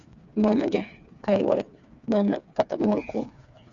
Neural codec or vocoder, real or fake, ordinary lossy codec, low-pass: codec, 16 kHz, 4 kbps, FreqCodec, smaller model; fake; Opus, 64 kbps; 7.2 kHz